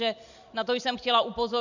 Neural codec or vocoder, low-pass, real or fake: none; 7.2 kHz; real